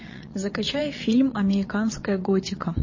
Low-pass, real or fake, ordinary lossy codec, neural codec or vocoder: 7.2 kHz; fake; MP3, 32 kbps; vocoder, 22.05 kHz, 80 mel bands, WaveNeXt